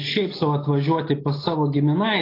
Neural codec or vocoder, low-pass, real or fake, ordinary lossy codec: none; 5.4 kHz; real; AAC, 24 kbps